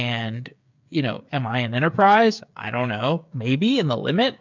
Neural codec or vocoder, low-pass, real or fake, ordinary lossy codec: codec, 16 kHz, 8 kbps, FreqCodec, smaller model; 7.2 kHz; fake; MP3, 64 kbps